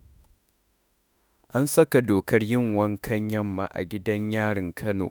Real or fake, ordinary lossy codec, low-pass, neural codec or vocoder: fake; none; none; autoencoder, 48 kHz, 32 numbers a frame, DAC-VAE, trained on Japanese speech